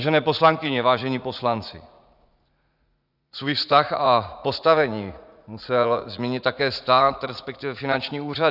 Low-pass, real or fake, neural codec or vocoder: 5.4 kHz; fake; vocoder, 44.1 kHz, 80 mel bands, Vocos